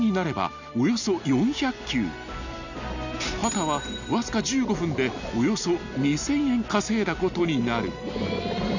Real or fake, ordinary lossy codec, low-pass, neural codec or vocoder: real; none; 7.2 kHz; none